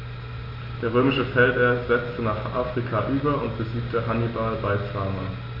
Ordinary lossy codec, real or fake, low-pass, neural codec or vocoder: MP3, 32 kbps; real; 5.4 kHz; none